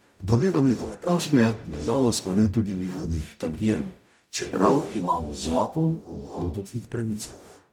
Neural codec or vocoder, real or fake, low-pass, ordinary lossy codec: codec, 44.1 kHz, 0.9 kbps, DAC; fake; 19.8 kHz; none